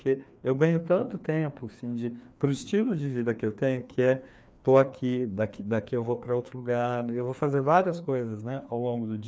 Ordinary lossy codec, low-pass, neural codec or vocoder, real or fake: none; none; codec, 16 kHz, 2 kbps, FreqCodec, larger model; fake